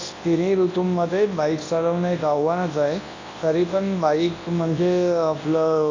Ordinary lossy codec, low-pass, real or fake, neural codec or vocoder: AAC, 32 kbps; 7.2 kHz; fake; codec, 24 kHz, 0.9 kbps, WavTokenizer, large speech release